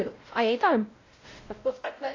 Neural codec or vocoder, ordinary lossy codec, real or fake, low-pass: codec, 16 kHz, 0.5 kbps, X-Codec, WavLM features, trained on Multilingual LibriSpeech; AAC, 32 kbps; fake; 7.2 kHz